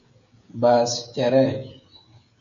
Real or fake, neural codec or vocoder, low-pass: fake; codec, 16 kHz, 16 kbps, FreqCodec, smaller model; 7.2 kHz